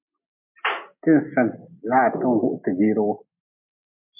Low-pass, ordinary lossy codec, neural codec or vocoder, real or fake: 3.6 kHz; MP3, 32 kbps; none; real